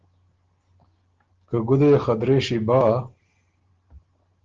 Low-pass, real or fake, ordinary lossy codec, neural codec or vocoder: 7.2 kHz; real; Opus, 16 kbps; none